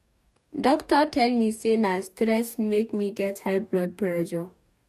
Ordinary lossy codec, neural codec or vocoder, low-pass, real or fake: none; codec, 44.1 kHz, 2.6 kbps, DAC; 14.4 kHz; fake